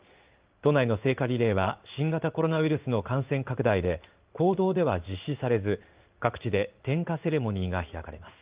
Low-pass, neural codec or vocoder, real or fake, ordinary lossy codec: 3.6 kHz; codec, 16 kHz in and 24 kHz out, 1 kbps, XY-Tokenizer; fake; Opus, 32 kbps